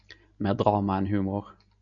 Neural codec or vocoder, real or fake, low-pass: none; real; 7.2 kHz